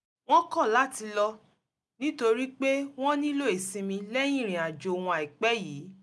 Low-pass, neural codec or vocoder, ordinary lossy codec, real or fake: none; none; none; real